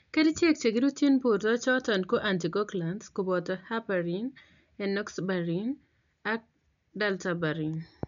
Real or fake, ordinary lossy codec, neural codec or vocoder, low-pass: real; none; none; 7.2 kHz